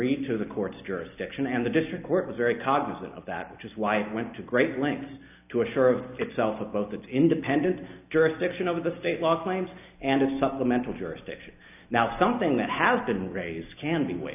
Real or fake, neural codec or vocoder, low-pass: real; none; 3.6 kHz